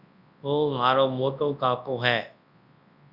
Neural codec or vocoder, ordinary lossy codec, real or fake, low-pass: codec, 24 kHz, 0.9 kbps, WavTokenizer, large speech release; Opus, 64 kbps; fake; 5.4 kHz